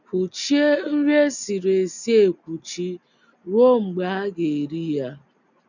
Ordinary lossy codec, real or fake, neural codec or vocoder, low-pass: none; real; none; 7.2 kHz